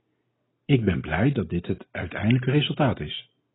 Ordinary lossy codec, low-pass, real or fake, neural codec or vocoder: AAC, 16 kbps; 7.2 kHz; real; none